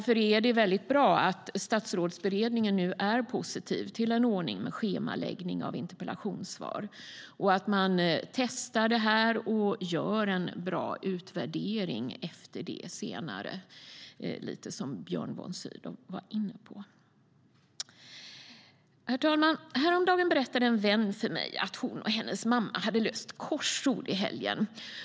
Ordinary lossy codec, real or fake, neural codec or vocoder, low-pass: none; real; none; none